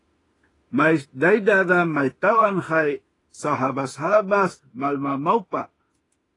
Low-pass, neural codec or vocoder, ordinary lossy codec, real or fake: 10.8 kHz; autoencoder, 48 kHz, 32 numbers a frame, DAC-VAE, trained on Japanese speech; AAC, 32 kbps; fake